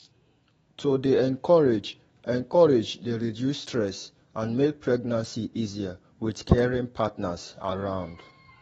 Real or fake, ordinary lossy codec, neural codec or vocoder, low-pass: fake; AAC, 24 kbps; autoencoder, 48 kHz, 128 numbers a frame, DAC-VAE, trained on Japanese speech; 19.8 kHz